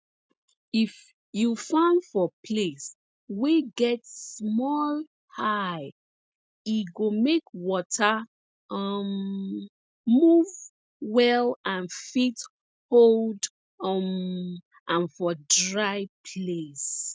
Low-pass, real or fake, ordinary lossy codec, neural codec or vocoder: none; real; none; none